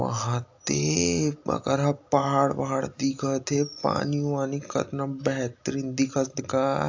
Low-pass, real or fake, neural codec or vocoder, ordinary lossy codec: 7.2 kHz; real; none; AAC, 48 kbps